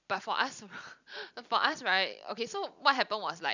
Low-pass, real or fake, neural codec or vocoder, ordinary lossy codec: 7.2 kHz; fake; vocoder, 44.1 kHz, 128 mel bands every 256 samples, BigVGAN v2; none